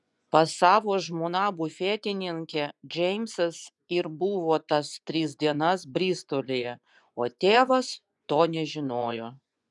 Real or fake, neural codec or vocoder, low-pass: fake; vocoder, 24 kHz, 100 mel bands, Vocos; 10.8 kHz